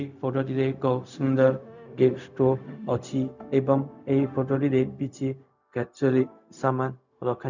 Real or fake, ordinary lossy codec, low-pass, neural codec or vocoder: fake; none; 7.2 kHz; codec, 16 kHz, 0.4 kbps, LongCat-Audio-Codec